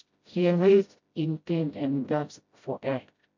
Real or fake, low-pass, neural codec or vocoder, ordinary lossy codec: fake; 7.2 kHz; codec, 16 kHz, 0.5 kbps, FreqCodec, smaller model; MP3, 48 kbps